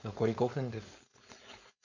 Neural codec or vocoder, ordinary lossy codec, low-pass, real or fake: codec, 16 kHz, 4.8 kbps, FACodec; MP3, 64 kbps; 7.2 kHz; fake